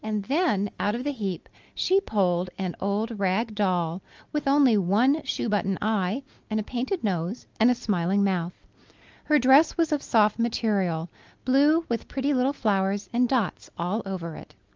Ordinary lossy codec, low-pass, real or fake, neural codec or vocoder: Opus, 24 kbps; 7.2 kHz; real; none